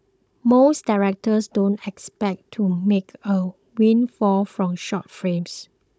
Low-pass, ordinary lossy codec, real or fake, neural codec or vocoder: none; none; fake; codec, 16 kHz, 16 kbps, FunCodec, trained on Chinese and English, 50 frames a second